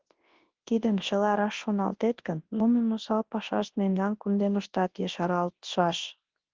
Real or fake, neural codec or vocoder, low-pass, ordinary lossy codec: fake; codec, 24 kHz, 0.9 kbps, WavTokenizer, large speech release; 7.2 kHz; Opus, 16 kbps